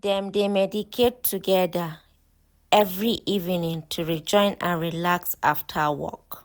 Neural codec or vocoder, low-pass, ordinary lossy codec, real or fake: none; none; none; real